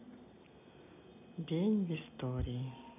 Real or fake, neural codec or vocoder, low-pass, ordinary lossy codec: real; none; 3.6 kHz; none